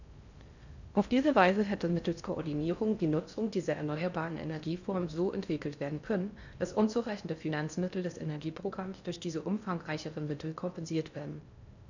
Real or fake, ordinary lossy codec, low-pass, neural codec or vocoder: fake; none; 7.2 kHz; codec, 16 kHz in and 24 kHz out, 0.6 kbps, FocalCodec, streaming, 4096 codes